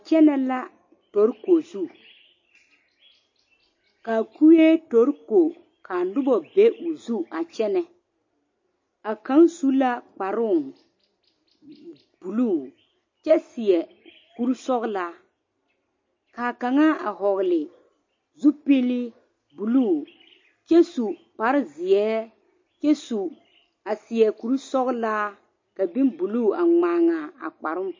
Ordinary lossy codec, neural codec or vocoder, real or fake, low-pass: MP3, 32 kbps; none; real; 7.2 kHz